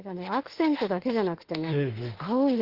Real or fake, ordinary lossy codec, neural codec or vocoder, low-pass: fake; Opus, 16 kbps; autoencoder, 48 kHz, 32 numbers a frame, DAC-VAE, trained on Japanese speech; 5.4 kHz